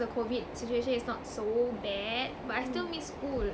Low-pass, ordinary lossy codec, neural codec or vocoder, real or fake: none; none; none; real